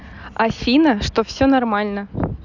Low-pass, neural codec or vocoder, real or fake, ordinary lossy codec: 7.2 kHz; none; real; none